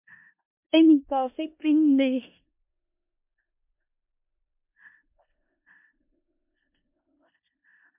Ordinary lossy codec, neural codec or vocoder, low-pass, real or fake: MP3, 32 kbps; codec, 16 kHz in and 24 kHz out, 0.4 kbps, LongCat-Audio-Codec, four codebook decoder; 3.6 kHz; fake